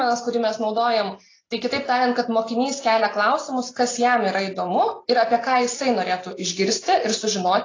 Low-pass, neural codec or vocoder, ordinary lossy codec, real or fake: 7.2 kHz; none; AAC, 32 kbps; real